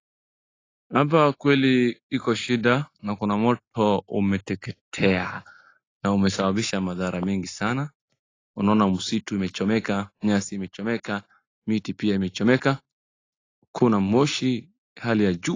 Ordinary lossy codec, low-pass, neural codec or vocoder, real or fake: AAC, 32 kbps; 7.2 kHz; none; real